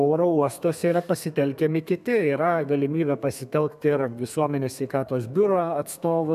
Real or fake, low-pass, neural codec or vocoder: fake; 14.4 kHz; codec, 32 kHz, 1.9 kbps, SNAC